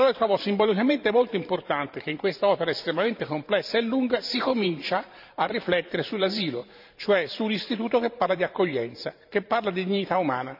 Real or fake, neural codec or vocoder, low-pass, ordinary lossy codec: fake; vocoder, 44.1 kHz, 128 mel bands every 512 samples, BigVGAN v2; 5.4 kHz; none